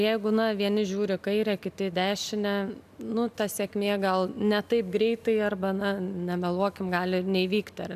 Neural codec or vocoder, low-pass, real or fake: none; 14.4 kHz; real